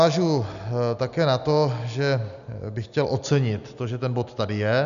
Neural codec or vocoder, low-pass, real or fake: none; 7.2 kHz; real